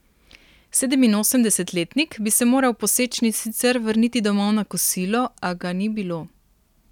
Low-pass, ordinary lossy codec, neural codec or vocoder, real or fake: 19.8 kHz; none; none; real